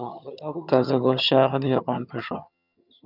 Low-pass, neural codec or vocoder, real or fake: 5.4 kHz; vocoder, 22.05 kHz, 80 mel bands, HiFi-GAN; fake